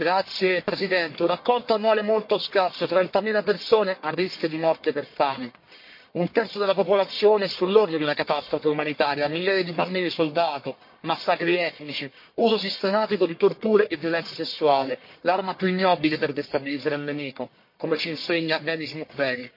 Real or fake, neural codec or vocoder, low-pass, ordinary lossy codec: fake; codec, 44.1 kHz, 1.7 kbps, Pupu-Codec; 5.4 kHz; MP3, 32 kbps